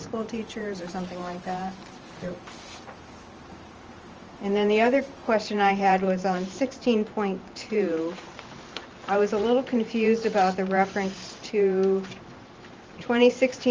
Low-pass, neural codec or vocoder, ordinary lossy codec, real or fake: 7.2 kHz; vocoder, 44.1 kHz, 128 mel bands every 512 samples, BigVGAN v2; Opus, 24 kbps; fake